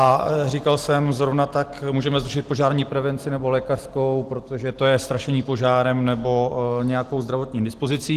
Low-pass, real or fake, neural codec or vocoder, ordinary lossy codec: 14.4 kHz; real; none; Opus, 16 kbps